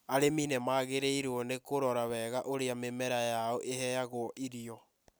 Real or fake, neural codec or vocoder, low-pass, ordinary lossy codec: real; none; none; none